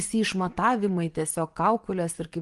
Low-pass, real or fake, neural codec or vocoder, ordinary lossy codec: 10.8 kHz; fake; vocoder, 24 kHz, 100 mel bands, Vocos; Opus, 24 kbps